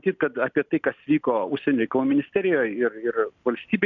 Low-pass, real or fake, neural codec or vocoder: 7.2 kHz; real; none